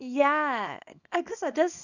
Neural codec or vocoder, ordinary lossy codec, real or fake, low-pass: codec, 24 kHz, 0.9 kbps, WavTokenizer, small release; none; fake; 7.2 kHz